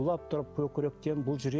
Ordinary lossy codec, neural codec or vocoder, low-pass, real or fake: none; none; none; real